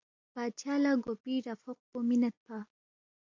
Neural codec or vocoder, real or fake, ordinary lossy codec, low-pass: none; real; AAC, 48 kbps; 7.2 kHz